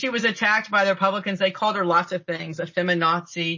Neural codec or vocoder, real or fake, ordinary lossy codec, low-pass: none; real; MP3, 32 kbps; 7.2 kHz